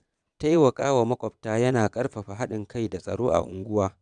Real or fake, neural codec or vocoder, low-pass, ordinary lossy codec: fake; vocoder, 22.05 kHz, 80 mel bands, Vocos; 9.9 kHz; none